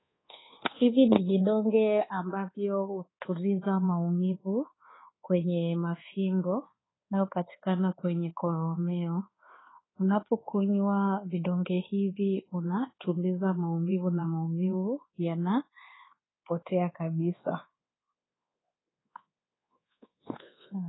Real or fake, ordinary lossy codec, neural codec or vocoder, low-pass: fake; AAC, 16 kbps; codec, 24 kHz, 1.2 kbps, DualCodec; 7.2 kHz